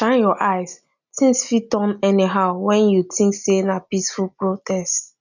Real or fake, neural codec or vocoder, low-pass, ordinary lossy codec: real; none; 7.2 kHz; none